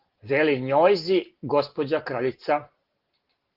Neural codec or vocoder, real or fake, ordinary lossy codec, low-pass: none; real; Opus, 16 kbps; 5.4 kHz